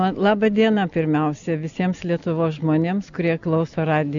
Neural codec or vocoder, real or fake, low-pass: none; real; 7.2 kHz